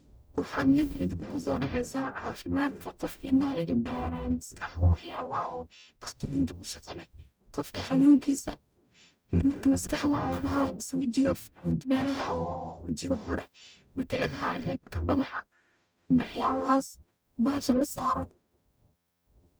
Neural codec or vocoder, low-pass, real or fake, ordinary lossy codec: codec, 44.1 kHz, 0.9 kbps, DAC; none; fake; none